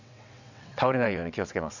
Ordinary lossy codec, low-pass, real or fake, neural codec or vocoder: none; 7.2 kHz; fake; vocoder, 22.05 kHz, 80 mel bands, WaveNeXt